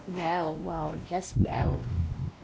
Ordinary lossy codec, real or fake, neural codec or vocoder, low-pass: none; fake; codec, 16 kHz, 1 kbps, X-Codec, WavLM features, trained on Multilingual LibriSpeech; none